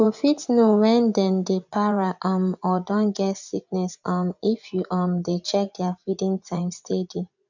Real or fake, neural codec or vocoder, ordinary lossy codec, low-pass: fake; vocoder, 24 kHz, 100 mel bands, Vocos; none; 7.2 kHz